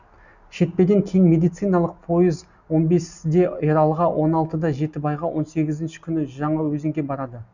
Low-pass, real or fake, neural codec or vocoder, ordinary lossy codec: 7.2 kHz; real; none; none